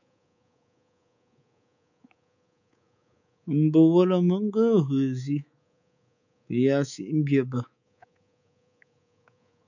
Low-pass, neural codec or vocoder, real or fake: 7.2 kHz; codec, 24 kHz, 3.1 kbps, DualCodec; fake